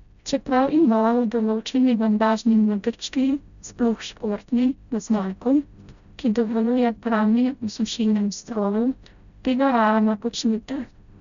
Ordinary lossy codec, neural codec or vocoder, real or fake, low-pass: none; codec, 16 kHz, 0.5 kbps, FreqCodec, smaller model; fake; 7.2 kHz